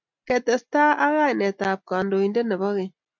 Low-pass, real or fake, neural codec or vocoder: 7.2 kHz; real; none